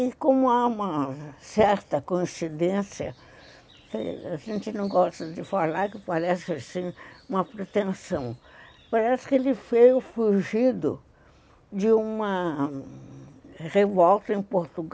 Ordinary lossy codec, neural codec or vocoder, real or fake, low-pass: none; none; real; none